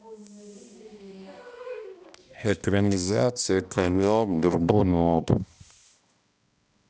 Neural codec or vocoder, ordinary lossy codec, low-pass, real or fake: codec, 16 kHz, 1 kbps, X-Codec, HuBERT features, trained on balanced general audio; none; none; fake